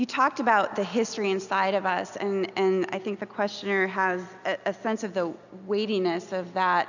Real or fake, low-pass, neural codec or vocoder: real; 7.2 kHz; none